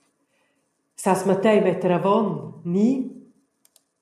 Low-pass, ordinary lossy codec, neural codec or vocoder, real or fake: 14.4 kHz; MP3, 96 kbps; none; real